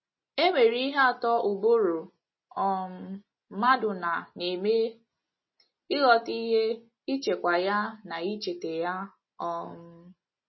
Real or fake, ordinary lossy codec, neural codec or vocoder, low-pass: real; MP3, 24 kbps; none; 7.2 kHz